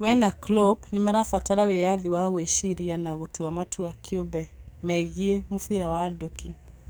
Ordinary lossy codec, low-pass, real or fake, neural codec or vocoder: none; none; fake; codec, 44.1 kHz, 2.6 kbps, SNAC